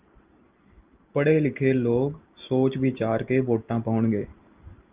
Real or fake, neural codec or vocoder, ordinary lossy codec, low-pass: real; none; Opus, 32 kbps; 3.6 kHz